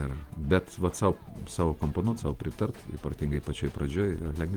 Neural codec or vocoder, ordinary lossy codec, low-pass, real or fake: vocoder, 48 kHz, 128 mel bands, Vocos; Opus, 24 kbps; 19.8 kHz; fake